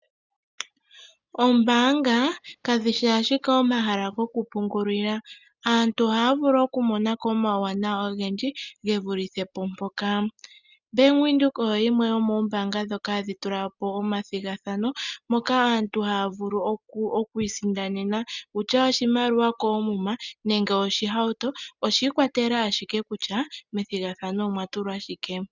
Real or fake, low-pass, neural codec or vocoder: real; 7.2 kHz; none